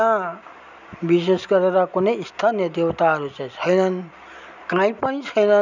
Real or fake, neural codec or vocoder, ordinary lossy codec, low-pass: real; none; none; 7.2 kHz